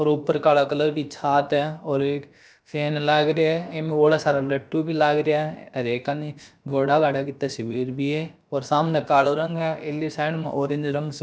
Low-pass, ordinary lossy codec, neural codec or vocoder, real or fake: none; none; codec, 16 kHz, about 1 kbps, DyCAST, with the encoder's durations; fake